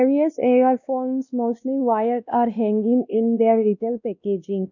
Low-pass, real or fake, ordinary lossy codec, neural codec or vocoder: 7.2 kHz; fake; none; codec, 16 kHz, 1 kbps, X-Codec, WavLM features, trained on Multilingual LibriSpeech